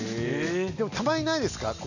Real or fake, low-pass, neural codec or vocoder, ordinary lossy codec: real; 7.2 kHz; none; none